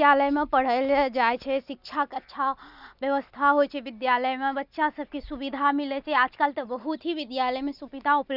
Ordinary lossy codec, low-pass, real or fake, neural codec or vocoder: none; 5.4 kHz; real; none